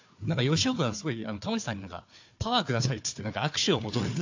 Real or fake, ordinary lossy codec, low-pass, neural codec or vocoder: fake; AAC, 48 kbps; 7.2 kHz; codec, 16 kHz, 4 kbps, FunCodec, trained on Chinese and English, 50 frames a second